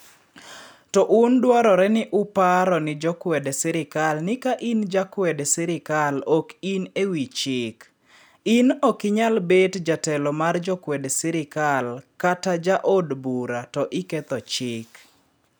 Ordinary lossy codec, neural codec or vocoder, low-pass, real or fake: none; none; none; real